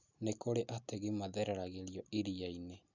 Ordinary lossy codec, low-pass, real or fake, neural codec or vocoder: none; 7.2 kHz; real; none